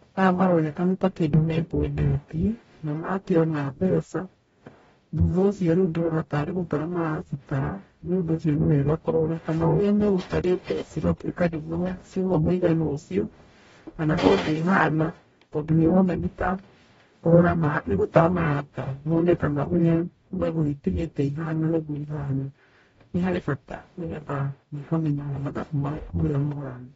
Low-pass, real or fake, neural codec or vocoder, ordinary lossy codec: 19.8 kHz; fake; codec, 44.1 kHz, 0.9 kbps, DAC; AAC, 24 kbps